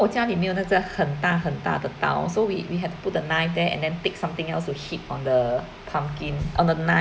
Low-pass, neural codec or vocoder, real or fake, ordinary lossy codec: none; none; real; none